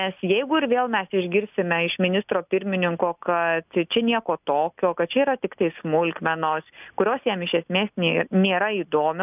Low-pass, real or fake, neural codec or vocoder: 3.6 kHz; real; none